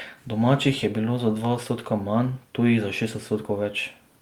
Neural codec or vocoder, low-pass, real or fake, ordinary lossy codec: none; 19.8 kHz; real; Opus, 32 kbps